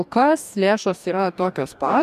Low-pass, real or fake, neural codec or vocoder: 14.4 kHz; fake; codec, 44.1 kHz, 2.6 kbps, DAC